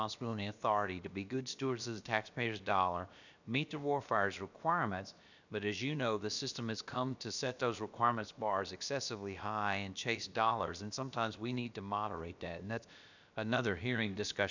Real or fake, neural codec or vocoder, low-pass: fake; codec, 16 kHz, about 1 kbps, DyCAST, with the encoder's durations; 7.2 kHz